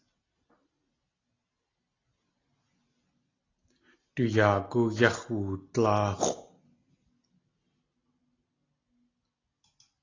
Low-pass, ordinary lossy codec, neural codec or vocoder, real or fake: 7.2 kHz; AAC, 32 kbps; none; real